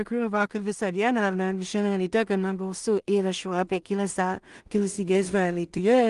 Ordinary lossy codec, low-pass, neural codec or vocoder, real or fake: Opus, 32 kbps; 10.8 kHz; codec, 16 kHz in and 24 kHz out, 0.4 kbps, LongCat-Audio-Codec, two codebook decoder; fake